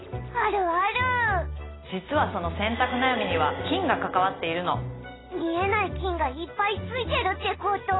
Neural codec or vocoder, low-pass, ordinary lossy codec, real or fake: none; 7.2 kHz; AAC, 16 kbps; real